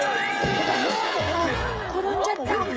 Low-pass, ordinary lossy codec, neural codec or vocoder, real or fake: none; none; codec, 16 kHz, 16 kbps, FreqCodec, smaller model; fake